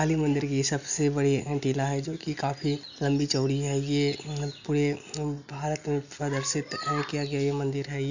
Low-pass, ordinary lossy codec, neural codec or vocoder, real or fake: 7.2 kHz; none; none; real